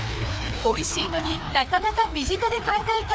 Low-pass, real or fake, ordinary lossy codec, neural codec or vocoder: none; fake; none; codec, 16 kHz, 2 kbps, FreqCodec, larger model